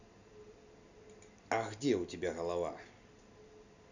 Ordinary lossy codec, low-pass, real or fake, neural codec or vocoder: none; 7.2 kHz; real; none